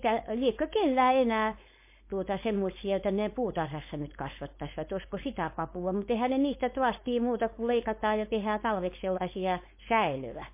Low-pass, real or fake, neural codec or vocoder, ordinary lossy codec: 3.6 kHz; fake; codec, 16 kHz, 4.8 kbps, FACodec; MP3, 24 kbps